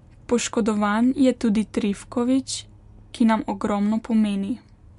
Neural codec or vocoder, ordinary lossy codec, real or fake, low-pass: none; MP3, 64 kbps; real; 10.8 kHz